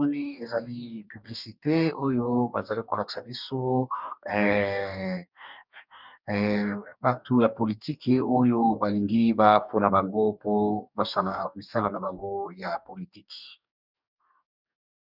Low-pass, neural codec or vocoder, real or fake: 5.4 kHz; codec, 44.1 kHz, 2.6 kbps, DAC; fake